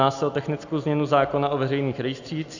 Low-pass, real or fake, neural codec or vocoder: 7.2 kHz; real; none